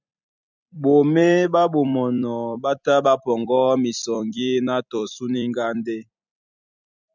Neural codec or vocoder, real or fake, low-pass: vocoder, 44.1 kHz, 128 mel bands every 256 samples, BigVGAN v2; fake; 7.2 kHz